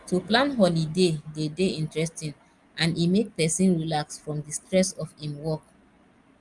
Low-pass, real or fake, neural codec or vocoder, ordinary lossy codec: 10.8 kHz; real; none; Opus, 32 kbps